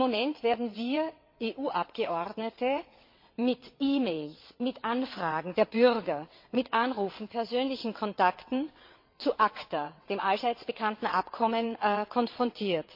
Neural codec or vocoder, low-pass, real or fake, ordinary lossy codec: vocoder, 22.05 kHz, 80 mel bands, WaveNeXt; 5.4 kHz; fake; MP3, 32 kbps